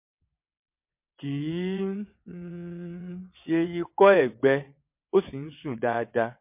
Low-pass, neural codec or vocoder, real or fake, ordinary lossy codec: 3.6 kHz; vocoder, 22.05 kHz, 80 mel bands, WaveNeXt; fake; MP3, 32 kbps